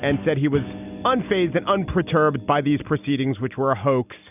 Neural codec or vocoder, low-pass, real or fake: none; 3.6 kHz; real